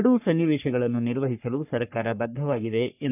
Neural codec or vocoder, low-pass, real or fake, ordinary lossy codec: codec, 44.1 kHz, 3.4 kbps, Pupu-Codec; 3.6 kHz; fake; none